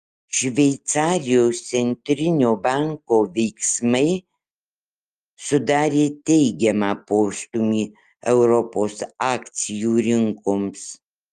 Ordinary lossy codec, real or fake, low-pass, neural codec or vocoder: Opus, 32 kbps; real; 14.4 kHz; none